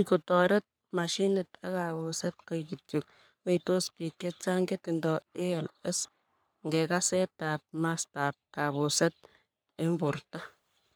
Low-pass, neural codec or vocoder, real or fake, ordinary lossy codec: none; codec, 44.1 kHz, 3.4 kbps, Pupu-Codec; fake; none